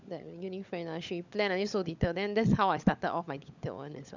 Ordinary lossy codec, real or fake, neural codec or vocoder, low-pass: none; fake; codec, 16 kHz, 16 kbps, FunCodec, trained on LibriTTS, 50 frames a second; 7.2 kHz